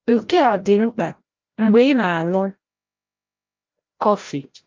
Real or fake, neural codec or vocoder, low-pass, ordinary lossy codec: fake; codec, 16 kHz, 0.5 kbps, FreqCodec, larger model; 7.2 kHz; Opus, 32 kbps